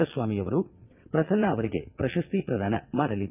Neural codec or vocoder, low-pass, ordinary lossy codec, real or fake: vocoder, 22.05 kHz, 80 mel bands, WaveNeXt; 3.6 kHz; none; fake